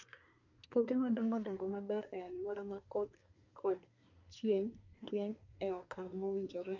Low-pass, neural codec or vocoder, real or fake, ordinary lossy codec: 7.2 kHz; codec, 24 kHz, 1 kbps, SNAC; fake; MP3, 64 kbps